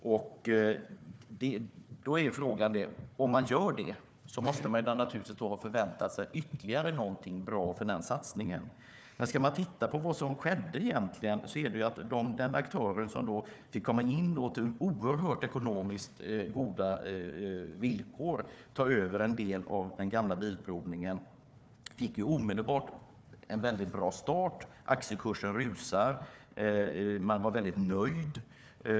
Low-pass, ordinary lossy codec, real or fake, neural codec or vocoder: none; none; fake; codec, 16 kHz, 4 kbps, FunCodec, trained on Chinese and English, 50 frames a second